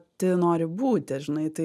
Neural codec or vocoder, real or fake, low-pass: none; real; 14.4 kHz